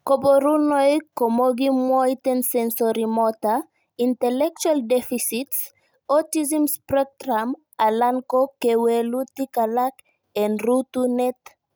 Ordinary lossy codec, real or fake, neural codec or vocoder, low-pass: none; real; none; none